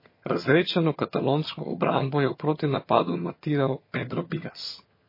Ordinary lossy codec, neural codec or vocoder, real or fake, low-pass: MP3, 24 kbps; vocoder, 22.05 kHz, 80 mel bands, HiFi-GAN; fake; 5.4 kHz